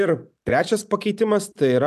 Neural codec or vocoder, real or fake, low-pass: none; real; 14.4 kHz